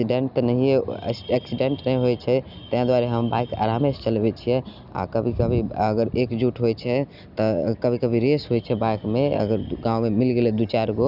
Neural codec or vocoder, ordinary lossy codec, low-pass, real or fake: none; none; 5.4 kHz; real